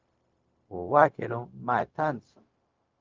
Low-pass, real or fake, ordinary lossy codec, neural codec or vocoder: 7.2 kHz; fake; Opus, 24 kbps; codec, 16 kHz, 0.4 kbps, LongCat-Audio-Codec